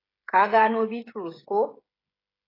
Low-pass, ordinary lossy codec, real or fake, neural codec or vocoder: 5.4 kHz; AAC, 24 kbps; fake; codec, 16 kHz, 16 kbps, FreqCodec, smaller model